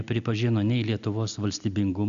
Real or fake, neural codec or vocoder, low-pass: real; none; 7.2 kHz